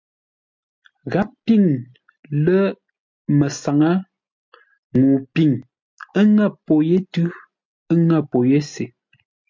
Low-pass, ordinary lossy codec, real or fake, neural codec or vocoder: 7.2 kHz; MP3, 48 kbps; real; none